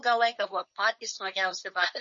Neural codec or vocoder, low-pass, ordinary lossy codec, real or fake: codec, 16 kHz, 4.8 kbps, FACodec; 7.2 kHz; MP3, 32 kbps; fake